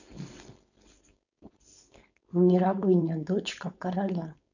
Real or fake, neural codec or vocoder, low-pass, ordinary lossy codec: fake; codec, 16 kHz, 4.8 kbps, FACodec; 7.2 kHz; none